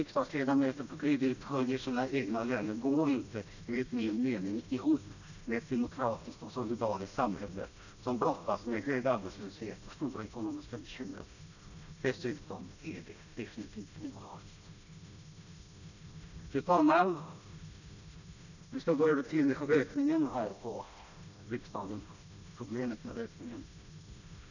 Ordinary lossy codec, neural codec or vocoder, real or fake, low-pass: none; codec, 16 kHz, 1 kbps, FreqCodec, smaller model; fake; 7.2 kHz